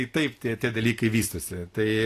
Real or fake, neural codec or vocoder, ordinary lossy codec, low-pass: real; none; AAC, 48 kbps; 14.4 kHz